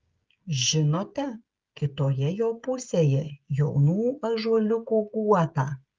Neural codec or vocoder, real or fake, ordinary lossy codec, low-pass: codec, 16 kHz, 16 kbps, FreqCodec, smaller model; fake; Opus, 24 kbps; 7.2 kHz